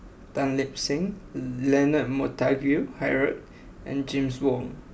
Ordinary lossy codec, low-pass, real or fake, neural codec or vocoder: none; none; real; none